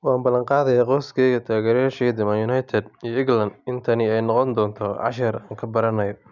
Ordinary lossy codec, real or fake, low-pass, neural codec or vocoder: none; real; 7.2 kHz; none